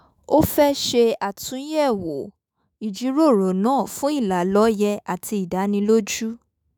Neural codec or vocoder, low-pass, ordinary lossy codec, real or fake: autoencoder, 48 kHz, 128 numbers a frame, DAC-VAE, trained on Japanese speech; none; none; fake